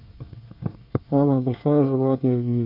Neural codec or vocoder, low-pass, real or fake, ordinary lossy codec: codec, 24 kHz, 1 kbps, SNAC; 5.4 kHz; fake; none